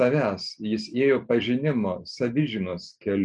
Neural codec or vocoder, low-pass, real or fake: none; 10.8 kHz; real